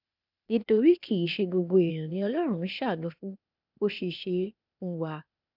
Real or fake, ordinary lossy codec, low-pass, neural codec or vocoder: fake; none; 5.4 kHz; codec, 16 kHz, 0.8 kbps, ZipCodec